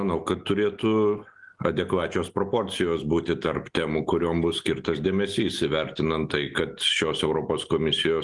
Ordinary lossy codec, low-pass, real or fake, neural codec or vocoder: Opus, 24 kbps; 10.8 kHz; real; none